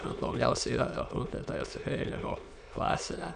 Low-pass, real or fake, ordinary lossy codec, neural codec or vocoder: 9.9 kHz; fake; MP3, 96 kbps; autoencoder, 22.05 kHz, a latent of 192 numbers a frame, VITS, trained on many speakers